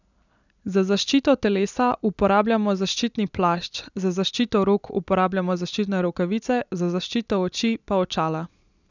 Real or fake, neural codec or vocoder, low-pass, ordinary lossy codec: real; none; 7.2 kHz; none